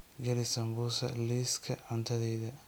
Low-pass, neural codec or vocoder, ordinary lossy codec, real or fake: none; none; none; real